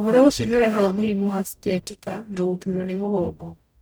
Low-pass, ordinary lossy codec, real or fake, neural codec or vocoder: none; none; fake; codec, 44.1 kHz, 0.9 kbps, DAC